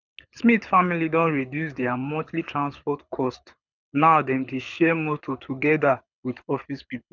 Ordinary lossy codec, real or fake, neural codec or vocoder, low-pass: none; fake; codec, 24 kHz, 6 kbps, HILCodec; 7.2 kHz